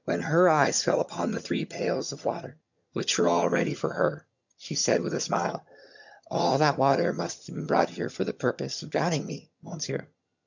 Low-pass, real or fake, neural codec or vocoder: 7.2 kHz; fake; vocoder, 22.05 kHz, 80 mel bands, HiFi-GAN